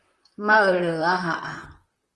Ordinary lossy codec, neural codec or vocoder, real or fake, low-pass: Opus, 24 kbps; vocoder, 44.1 kHz, 128 mel bands, Pupu-Vocoder; fake; 10.8 kHz